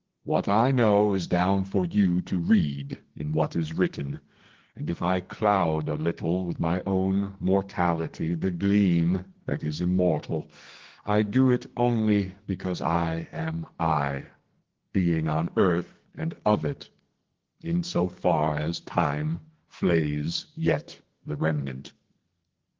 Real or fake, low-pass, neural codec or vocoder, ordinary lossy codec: fake; 7.2 kHz; codec, 44.1 kHz, 2.6 kbps, SNAC; Opus, 16 kbps